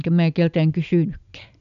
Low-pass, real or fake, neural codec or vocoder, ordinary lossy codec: 7.2 kHz; real; none; none